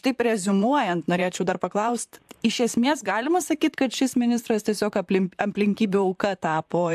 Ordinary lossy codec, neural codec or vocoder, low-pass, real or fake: AAC, 96 kbps; vocoder, 44.1 kHz, 128 mel bands, Pupu-Vocoder; 14.4 kHz; fake